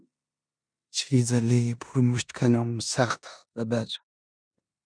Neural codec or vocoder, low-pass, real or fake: codec, 16 kHz in and 24 kHz out, 0.9 kbps, LongCat-Audio-Codec, four codebook decoder; 9.9 kHz; fake